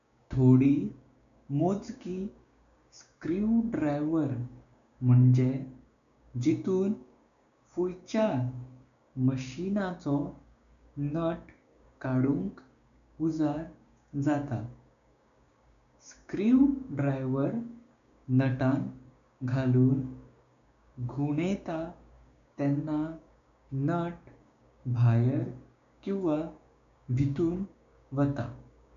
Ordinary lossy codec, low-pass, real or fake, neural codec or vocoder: none; 7.2 kHz; fake; codec, 16 kHz, 6 kbps, DAC